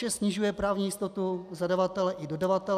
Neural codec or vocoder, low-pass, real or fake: none; 14.4 kHz; real